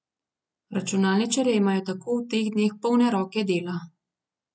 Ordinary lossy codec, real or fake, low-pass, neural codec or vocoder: none; real; none; none